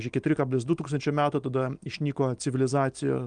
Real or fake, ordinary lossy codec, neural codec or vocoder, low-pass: real; Opus, 32 kbps; none; 9.9 kHz